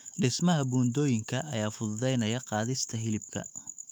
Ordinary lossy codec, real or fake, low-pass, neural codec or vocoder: none; fake; 19.8 kHz; autoencoder, 48 kHz, 128 numbers a frame, DAC-VAE, trained on Japanese speech